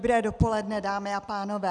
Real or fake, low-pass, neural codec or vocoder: real; 10.8 kHz; none